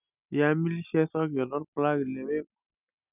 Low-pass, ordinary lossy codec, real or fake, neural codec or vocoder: 3.6 kHz; none; real; none